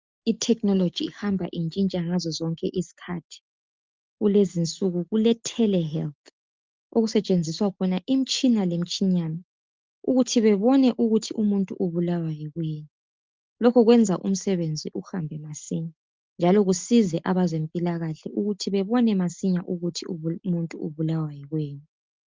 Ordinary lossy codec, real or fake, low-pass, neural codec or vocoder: Opus, 32 kbps; real; 7.2 kHz; none